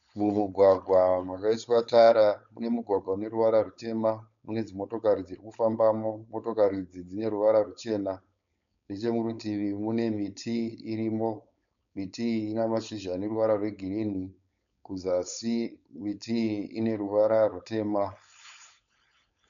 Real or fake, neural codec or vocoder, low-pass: fake; codec, 16 kHz, 4.8 kbps, FACodec; 7.2 kHz